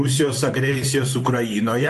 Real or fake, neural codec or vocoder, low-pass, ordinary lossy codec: fake; vocoder, 44.1 kHz, 128 mel bands, Pupu-Vocoder; 14.4 kHz; AAC, 64 kbps